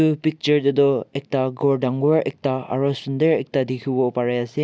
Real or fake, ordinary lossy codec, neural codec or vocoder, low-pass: real; none; none; none